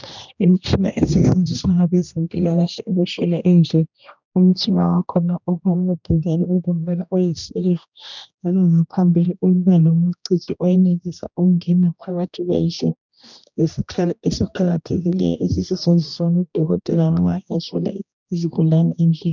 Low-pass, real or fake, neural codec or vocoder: 7.2 kHz; fake; codec, 16 kHz, 1 kbps, X-Codec, HuBERT features, trained on general audio